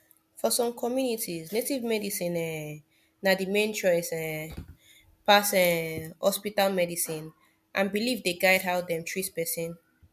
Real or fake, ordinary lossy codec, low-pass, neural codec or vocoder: real; MP3, 96 kbps; 14.4 kHz; none